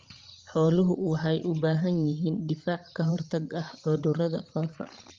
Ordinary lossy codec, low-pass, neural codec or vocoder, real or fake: Opus, 32 kbps; 7.2 kHz; codec, 16 kHz, 16 kbps, FreqCodec, larger model; fake